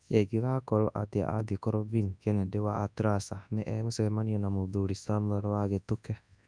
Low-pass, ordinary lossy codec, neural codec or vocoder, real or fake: 9.9 kHz; none; codec, 24 kHz, 0.9 kbps, WavTokenizer, large speech release; fake